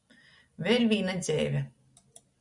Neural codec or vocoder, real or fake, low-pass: none; real; 10.8 kHz